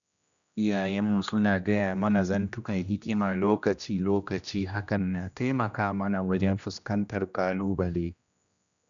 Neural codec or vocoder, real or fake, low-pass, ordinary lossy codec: codec, 16 kHz, 1 kbps, X-Codec, HuBERT features, trained on general audio; fake; 7.2 kHz; none